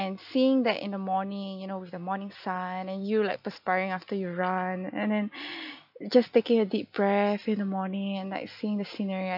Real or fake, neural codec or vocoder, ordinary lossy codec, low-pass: real; none; none; 5.4 kHz